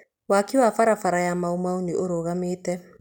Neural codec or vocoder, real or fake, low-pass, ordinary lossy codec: none; real; 19.8 kHz; none